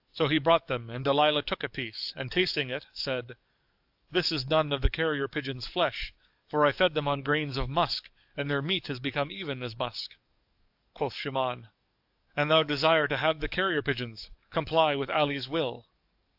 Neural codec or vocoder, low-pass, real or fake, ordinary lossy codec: codec, 44.1 kHz, 7.8 kbps, DAC; 5.4 kHz; fake; AAC, 48 kbps